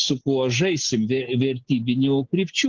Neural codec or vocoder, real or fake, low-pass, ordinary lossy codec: none; real; 7.2 kHz; Opus, 16 kbps